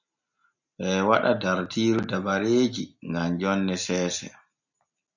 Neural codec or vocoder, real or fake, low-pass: none; real; 7.2 kHz